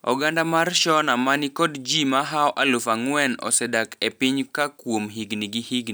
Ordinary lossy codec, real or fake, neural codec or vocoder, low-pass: none; real; none; none